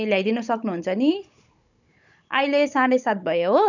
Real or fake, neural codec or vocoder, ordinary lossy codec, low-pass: fake; codec, 16 kHz, 16 kbps, FunCodec, trained on Chinese and English, 50 frames a second; none; 7.2 kHz